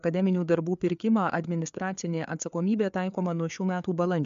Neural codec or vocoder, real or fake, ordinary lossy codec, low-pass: codec, 16 kHz, 4 kbps, FreqCodec, larger model; fake; MP3, 64 kbps; 7.2 kHz